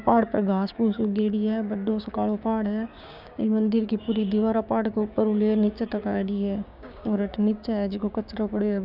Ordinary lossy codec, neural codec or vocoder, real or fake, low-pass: none; codec, 16 kHz, 6 kbps, DAC; fake; 5.4 kHz